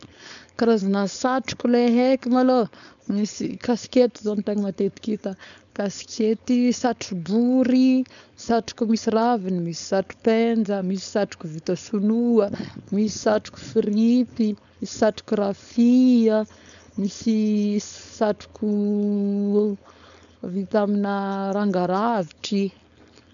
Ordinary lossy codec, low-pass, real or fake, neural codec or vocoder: none; 7.2 kHz; fake; codec, 16 kHz, 4.8 kbps, FACodec